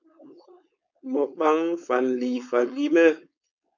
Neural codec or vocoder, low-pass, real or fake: codec, 16 kHz, 4.8 kbps, FACodec; 7.2 kHz; fake